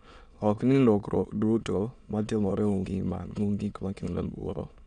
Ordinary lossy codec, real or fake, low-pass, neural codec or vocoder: none; fake; 9.9 kHz; autoencoder, 22.05 kHz, a latent of 192 numbers a frame, VITS, trained on many speakers